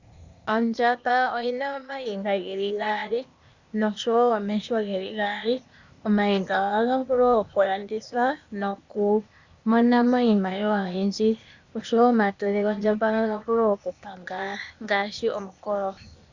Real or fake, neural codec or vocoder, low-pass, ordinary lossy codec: fake; codec, 16 kHz, 0.8 kbps, ZipCodec; 7.2 kHz; Opus, 64 kbps